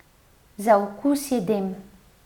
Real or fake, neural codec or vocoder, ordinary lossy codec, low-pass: real; none; none; 19.8 kHz